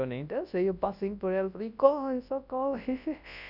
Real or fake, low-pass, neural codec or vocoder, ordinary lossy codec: fake; 5.4 kHz; codec, 24 kHz, 0.9 kbps, WavTokenizer, large speech release; none